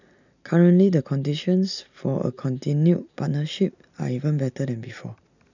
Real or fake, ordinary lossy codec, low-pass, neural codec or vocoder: real; none; 7.2 kHz; none